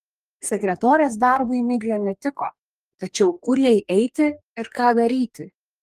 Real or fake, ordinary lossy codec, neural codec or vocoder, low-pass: fake; Opus, 32 kbps; codec, 44.1 kHz, 2.6 kbps, DAC; 14.4 kHz